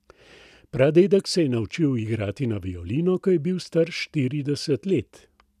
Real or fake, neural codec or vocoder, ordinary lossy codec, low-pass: real; none; none; 14.4 kHz